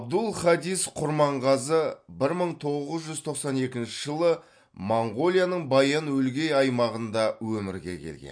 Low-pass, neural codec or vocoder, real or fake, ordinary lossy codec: 9.9 kHz; none; real; MP3, 48 kbps